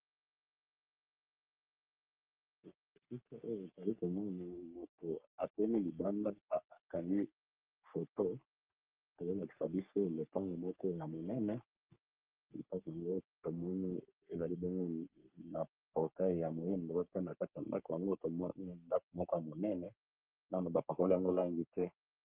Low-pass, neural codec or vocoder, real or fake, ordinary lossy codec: 3.6 kHz; codec, 44.1 kHz, 3.4 kbps, Pupu-Codec; fake; Opus, 24 kbps